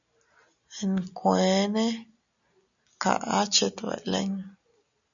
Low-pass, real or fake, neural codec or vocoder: 7.2 kHz; real; none